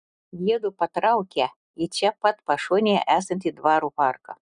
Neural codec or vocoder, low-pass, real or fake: none; 9.9 kHz; real